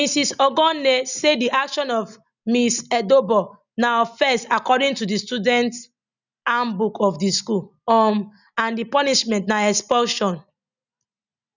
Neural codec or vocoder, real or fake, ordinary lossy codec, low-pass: none; real; none; 7.2 kHz